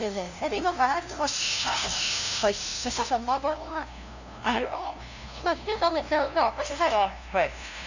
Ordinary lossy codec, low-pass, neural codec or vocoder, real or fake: none; 7.2 kHz; codec, 16 kHz, 0.5 kbps, FunCodec, trained on LibriTTS, 25 frames a second; fake